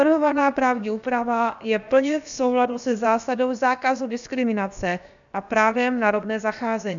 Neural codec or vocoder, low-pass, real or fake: codec, 16 kHz, about 1 kbps, DyCAST, with the encoder's durations; 7.2 kHz; fake